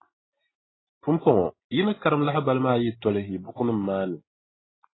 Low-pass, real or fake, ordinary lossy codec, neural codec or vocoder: 7.2 kHz; real; AAC, 16 kbps; none